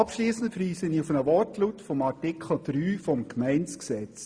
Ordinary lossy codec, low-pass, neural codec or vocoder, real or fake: Opus, 64 kbps; 9.9 kHz; none; real